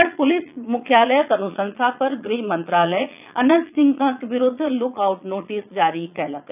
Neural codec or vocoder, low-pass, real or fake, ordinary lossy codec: vocoder, 22.05 kHz, 80 mel bands, Vocos; 3.6 kHz; fake; none